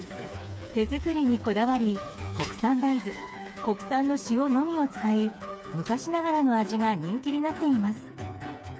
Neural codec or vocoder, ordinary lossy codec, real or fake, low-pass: codec, 16 kHz, 4 kbps, FreqCodec, smaller model; none; fake; none